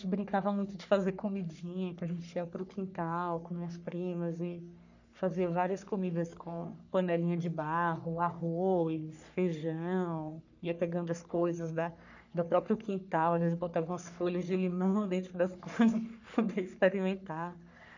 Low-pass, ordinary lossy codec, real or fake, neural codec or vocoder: 7.2 kHz; none; fake; codec, 44.1 kHz, 3.4 kbps, Pupu-Codec